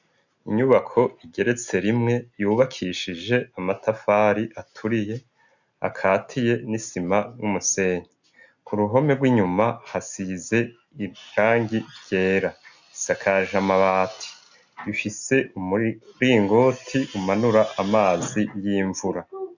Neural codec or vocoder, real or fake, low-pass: none; real; 7.2 kHz